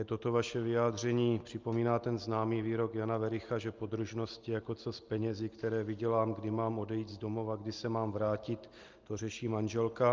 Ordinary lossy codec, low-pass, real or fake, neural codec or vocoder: Opus, 32 kbps; 7.2 kHz; real; none